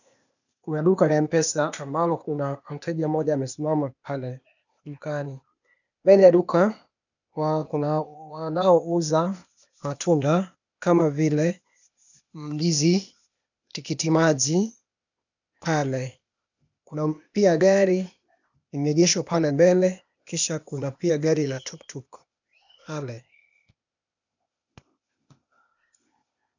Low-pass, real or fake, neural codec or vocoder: 7.2 kHz; fake; codec, 16 kHz, 0.8 kbps, ZipCodec